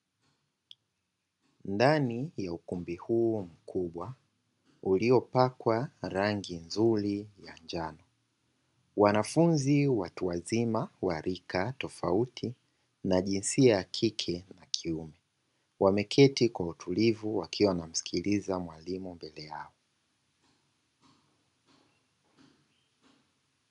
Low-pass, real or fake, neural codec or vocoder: 10.8 kHz; real; none